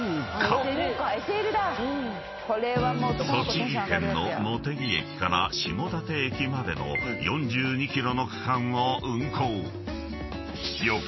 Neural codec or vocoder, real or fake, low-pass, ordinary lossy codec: none; real; 7.2 kHz; MP3, 24 kbps